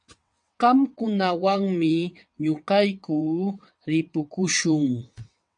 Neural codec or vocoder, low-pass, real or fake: vocoder, 22.05 kHz, 80 mel bands, WaveNeXt; 9.9 kHz; fake